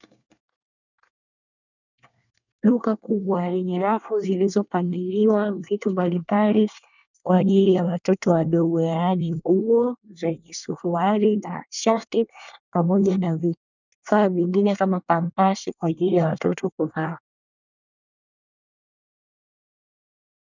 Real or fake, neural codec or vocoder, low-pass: fake; codec, 24 kHz, 1 kbps, SNAC; 7.2 kHz